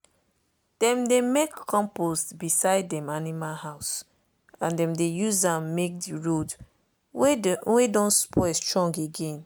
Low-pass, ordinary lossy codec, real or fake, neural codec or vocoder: none; none; real; none